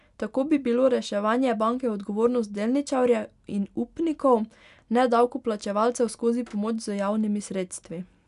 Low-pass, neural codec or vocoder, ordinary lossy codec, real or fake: 10.8 kHz; none; none; real